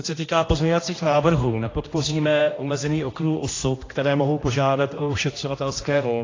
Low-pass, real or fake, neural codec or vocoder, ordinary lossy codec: 7.2 kHz; fake; codec, 16 kHz, 1 kbps, X-Codec, HuBERT features, trained on general audio; AAC, 32 kbps